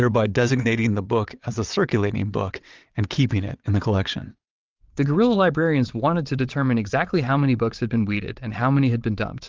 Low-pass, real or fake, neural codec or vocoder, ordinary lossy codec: 7.2 kHz; fake; vocoder, 22.05 kHz, 80 mel bands, WaveNeXt; Opus, 32 kbps